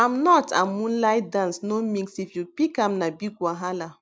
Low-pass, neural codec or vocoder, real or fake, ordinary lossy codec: none; none; real; none